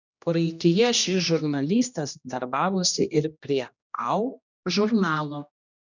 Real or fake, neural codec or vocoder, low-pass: fake; codec, 16 kHz, 1 kbps, X-Codec, HuBERT features, trained on general audio; 7.2 kHz